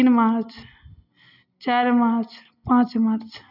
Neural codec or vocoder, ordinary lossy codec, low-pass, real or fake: none; none; 5.4 kHz; real